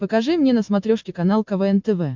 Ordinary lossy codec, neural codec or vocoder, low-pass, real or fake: MP3, 64 kbps; none; 7.2 kHz; real